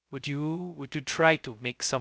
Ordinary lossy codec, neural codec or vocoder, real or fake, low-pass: none; codec, 16 kHz, 0.2 kbps, FocalCodec; fake; none